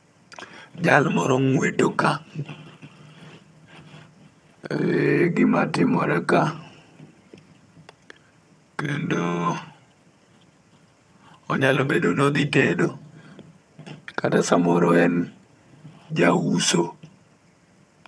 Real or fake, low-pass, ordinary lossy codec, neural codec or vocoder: fake; none; none; vocoder, 22.05 kHz, 80 mel bands, HiFi-GAN